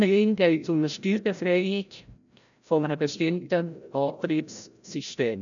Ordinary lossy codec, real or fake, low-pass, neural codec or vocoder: none; fake; 7.2 kHz; codec, 16 kHz, 0.5 kbps, FreqCodec, larger model